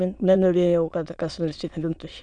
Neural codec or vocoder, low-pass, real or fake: autoencoder, 22.05 kHz, a latent of 192 numbers a frame, VITS, trained on many speakers; 9.9 kHz; fake